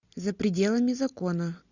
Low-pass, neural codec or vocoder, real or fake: 7.2 kHz; none; real